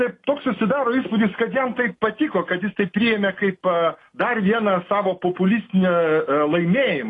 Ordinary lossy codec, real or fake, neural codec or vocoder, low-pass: AAC, 32 kbps; real; none; 10.8 kHz